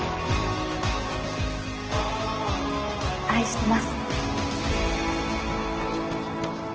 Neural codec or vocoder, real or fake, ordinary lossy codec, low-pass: none; real; Opus, 16 kbps; 7.2 kHz